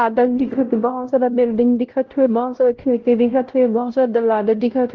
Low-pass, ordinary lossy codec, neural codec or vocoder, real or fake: 7.2 kHz; Opus, 16 kbps; codec, 16 kHz, 0.5 kbps, X-Codec, WavLM features, trained on Multilingual LibriSpeech; fake